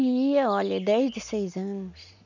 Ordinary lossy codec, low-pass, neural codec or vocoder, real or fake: none; 7.2 kHz; none; real